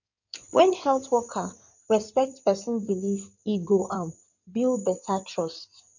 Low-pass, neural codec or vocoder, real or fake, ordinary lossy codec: 7.2 kHz; codec, 16 kHz in and 24 kHz out, 2.2 kbps, FireRedTTS-2 codec; fake; none